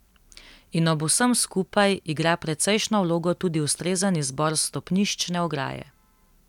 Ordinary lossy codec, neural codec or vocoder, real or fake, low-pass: none; none; real; 19.8 kHz